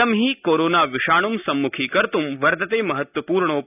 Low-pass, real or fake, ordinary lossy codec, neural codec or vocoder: 3.6 kHz; real; none; none